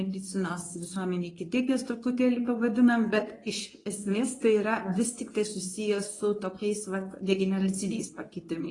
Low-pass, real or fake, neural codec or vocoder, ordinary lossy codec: 10.8 kHz; fake; codec, 24 kHz, 0.9 kbps, WavTokenizer, medium speech release version 2; AAC, 32 kbps